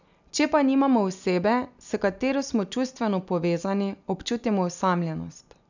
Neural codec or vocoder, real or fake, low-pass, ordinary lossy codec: none; real; 7.2 kHz; none